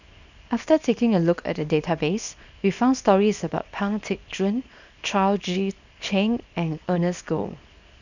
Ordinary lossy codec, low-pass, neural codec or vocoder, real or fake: none; 7.2 kHz; codec, 24 kHz, 0.9 kbps, WavTokenizer, small release; fake